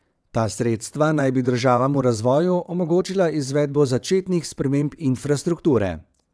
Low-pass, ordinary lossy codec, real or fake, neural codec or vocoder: none; none; fake; vocoder, 22.05 kHz, 80 mel bands, WaveNeXt